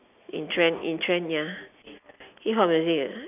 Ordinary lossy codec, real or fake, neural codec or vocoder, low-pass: none; real; none; 3.6 kHz